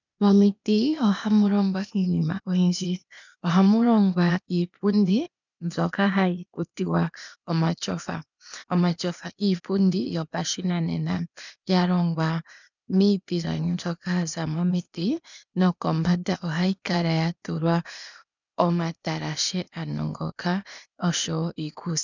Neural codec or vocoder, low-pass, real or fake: codec, 16 kHz, 0.8 kbps, ZipCodec; 7.2 kHz; fake